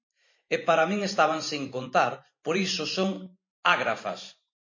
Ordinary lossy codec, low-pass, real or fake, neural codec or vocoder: MP3, 32 kbps; 7.2 kHz; fake; vocoder, 44.1 kHz, 128 mel bands every 512 samples, BigVGAN v2